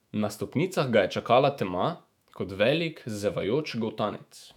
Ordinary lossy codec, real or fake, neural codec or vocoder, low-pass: none; fake; autoencoder, 48 kHz, 128 numbers a frame, DAC-VAE, trained on Japanese speech; 19.8 kHz